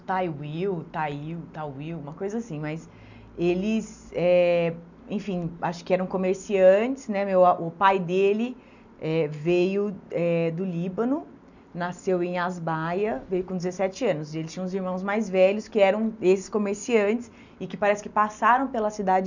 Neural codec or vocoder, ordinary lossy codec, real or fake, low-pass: none; none; real; 7.2 kHz